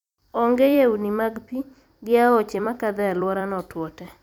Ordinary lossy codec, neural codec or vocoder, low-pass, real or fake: none; vocoder, 44.1 kHz, 128 mel bands every 256 samples, BigVGAN v2; 19.8 kHz; fake